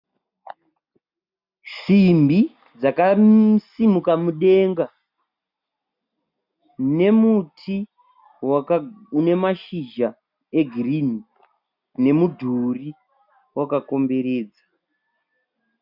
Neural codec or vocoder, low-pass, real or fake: none; 5.4 kHz; real